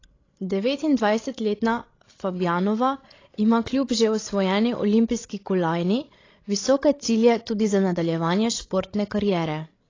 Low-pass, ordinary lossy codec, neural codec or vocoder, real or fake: 7.2 kHz; AAC, 32 kbps; codec, 16 kHz, 16 kbps, FreqCodec, larger model; fake